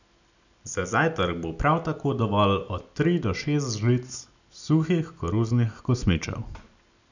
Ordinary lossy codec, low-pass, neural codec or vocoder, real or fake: none; 7.2 kHz; none; real